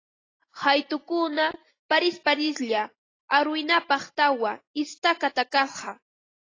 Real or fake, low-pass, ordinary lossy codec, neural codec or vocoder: real; 7.2 kHz; AAC, 32 kbps; none